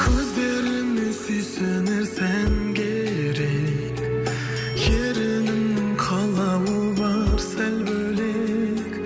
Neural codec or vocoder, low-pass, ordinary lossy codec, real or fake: none; none; none; real